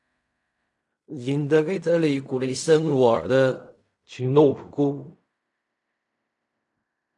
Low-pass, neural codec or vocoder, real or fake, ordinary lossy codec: 10.8 kHz; codec, 16 kHz in and 24 kHz out, 0.4 kbps, LongCat-Audio-Codec, fine tuned four codebook decoder; fake; AAC, 64 kbps